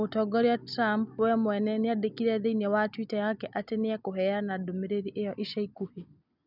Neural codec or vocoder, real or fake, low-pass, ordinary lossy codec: none; real; 5.4 kHz; none